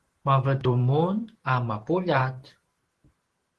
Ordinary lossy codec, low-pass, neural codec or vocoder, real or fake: Opus, 16 kbps; 10.8 kHz; vocoder, 44.1 kHz, 128 mel bands every 512 samples, BigVGAN v2; fake